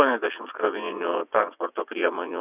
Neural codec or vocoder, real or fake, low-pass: vocoder, 22.05 kHz, 80 mel bands, WaveNeXt; fake; 3.6 kHz